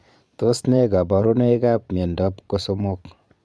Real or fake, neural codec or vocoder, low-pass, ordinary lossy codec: fake; vocoder, 22.05 kHz, 80 mel bands, Vocos; none; none